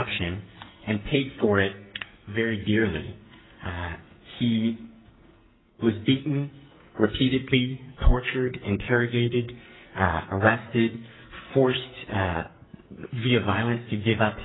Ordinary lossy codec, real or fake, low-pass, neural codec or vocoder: AAC, 16 kbps; fake; 7.2 kHz; codec, 44.1 kHz, 2.6 kbps, SNAC